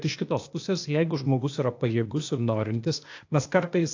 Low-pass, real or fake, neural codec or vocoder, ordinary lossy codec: 7.2 kHz; fake; codec, 16 kHz, 0.8 kbps, ZipCodec; AAC, 48 kbps